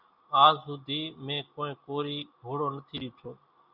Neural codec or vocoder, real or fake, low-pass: none; real; 5.4 kHz